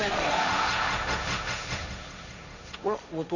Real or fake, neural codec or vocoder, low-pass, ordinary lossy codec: fake; codec, 16 kHz, 1.1 kbps, Voila-Tokenizer; 7.2 kHz; none